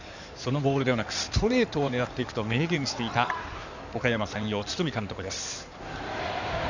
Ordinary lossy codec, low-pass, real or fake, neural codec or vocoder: none; 7.2 kHz; fake; codec, 16 kHz in and 24 kHz out, 2.2 kbps, FireRedTTS-2 codec